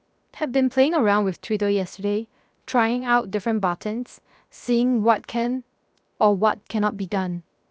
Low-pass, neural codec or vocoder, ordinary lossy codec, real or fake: none; codec, 16 kHz, 0.7 kbps, FocalCodec; none; fake